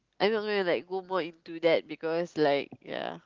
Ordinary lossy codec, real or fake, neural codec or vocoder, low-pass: Opus, 32 kbps; real; none; 7.2 kHz